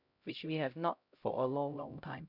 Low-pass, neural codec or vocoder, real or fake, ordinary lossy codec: 5.4 kHz; codec, 16 kHz, 0.5 kbps, X-Codec, HuBERT features, trained on LibriSpeech; fake; none